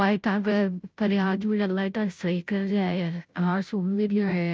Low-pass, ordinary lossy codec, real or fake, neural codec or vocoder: none; none; fake; codec, 16 kHz, 0.5 kbps, FunCodec, trained on Chinese and English, 25 frames a second